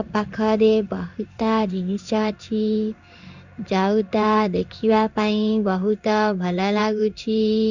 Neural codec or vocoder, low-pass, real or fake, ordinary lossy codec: codec, 16 kHz in and 24 kHz out, 1 kbps, XY-Tokenizer; 7.2 kHz; fake; none